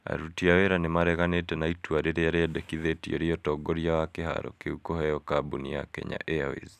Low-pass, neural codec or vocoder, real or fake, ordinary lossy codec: 14.4 kHz; none; real; none